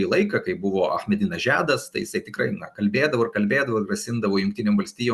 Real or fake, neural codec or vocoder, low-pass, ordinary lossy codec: real; none; 14.4 kHz; Opus, 64 kbps